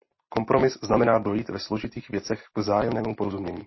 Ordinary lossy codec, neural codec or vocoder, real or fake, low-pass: MP3, 24 kbps; none; real; 7.2 kHz